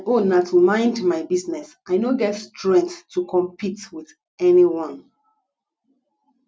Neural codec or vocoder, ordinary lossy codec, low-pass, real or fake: none; none; none; real